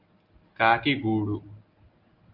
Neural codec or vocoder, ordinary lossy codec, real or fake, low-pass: none; Opus, 32 kbps; real; 5.4 kHz